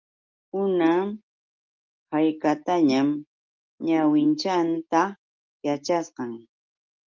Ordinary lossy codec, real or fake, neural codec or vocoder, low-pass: Opus, 32 kbps; real; none; 7.2 kHz